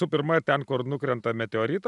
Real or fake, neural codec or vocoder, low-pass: real; none; 10.8 kHz